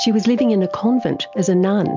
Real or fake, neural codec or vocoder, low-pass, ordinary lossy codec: real; none; 7.2 kHz; MP3, 64 kbps